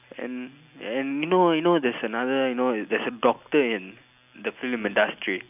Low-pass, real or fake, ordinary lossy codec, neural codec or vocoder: 3.6 kHz; real; none; none